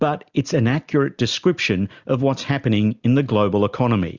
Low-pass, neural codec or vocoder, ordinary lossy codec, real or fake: 7.2 kHz; none; Opus, 64 kbps; real